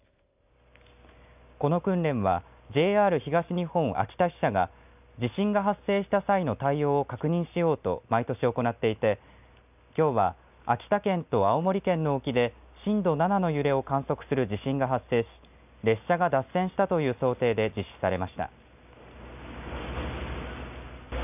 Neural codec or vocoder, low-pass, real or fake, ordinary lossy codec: none; 3.6 kHz; real; none